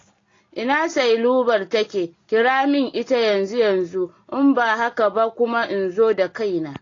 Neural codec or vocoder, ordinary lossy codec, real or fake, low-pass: none; AAC, 32 kbps; real; 7.2 kHz